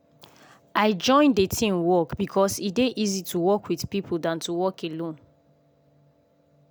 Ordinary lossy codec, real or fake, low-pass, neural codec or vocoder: none; real; none; none